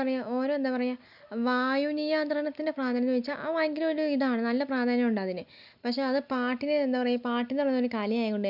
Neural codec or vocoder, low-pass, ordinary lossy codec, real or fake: none; 5.4 kHz; none; real